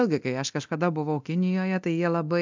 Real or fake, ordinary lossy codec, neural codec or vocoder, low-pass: fake; MP3, 64 kbps; codec, 24 kHz, 0.9 kbps, DualCodec; 7.2 kHz